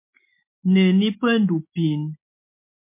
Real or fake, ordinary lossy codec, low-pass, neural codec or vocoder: real; MP3, 24 kbps; 3.6 kHz; none